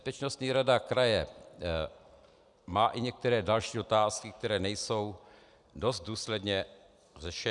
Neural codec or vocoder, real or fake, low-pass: none; real; 10.8 kHz